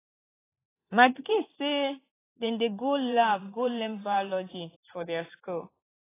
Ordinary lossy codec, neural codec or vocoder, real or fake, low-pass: AAC, 16 kbps; vocoder, 44.1 kHz, 128 mel bands every 512 samples, BigVGAN v2; fake; 3.6 kHz